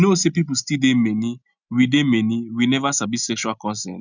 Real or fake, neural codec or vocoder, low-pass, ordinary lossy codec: real; none; 7.2 kHz; none